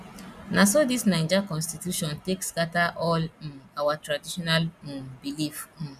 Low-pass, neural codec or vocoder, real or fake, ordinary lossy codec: 14.4 kHz; none; real; none